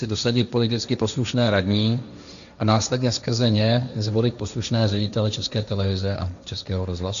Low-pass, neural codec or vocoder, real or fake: 7.2 kHz; codec, 16 kHz, 1.1 kbps, Voila-Tokenizer; fake